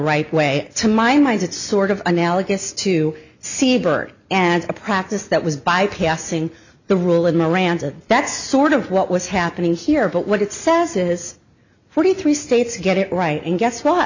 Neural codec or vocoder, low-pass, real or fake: none; 7.2 kHz; real